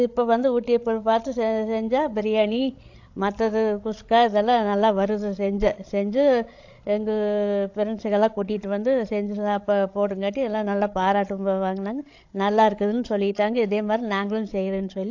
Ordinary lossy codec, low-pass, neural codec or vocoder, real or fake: AAC, 48 kbps; 7.2 kHz; codec, 16 kHz, 16 kbps, FreqCodec, larger model; fake